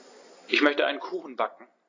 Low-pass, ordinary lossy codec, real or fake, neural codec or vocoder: 7.2 kHz; AAC, 32 kbps; real; none